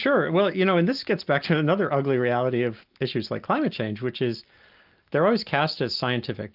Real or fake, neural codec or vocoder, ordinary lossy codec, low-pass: real; none; Opus, 24 kbps; 5.4 kHz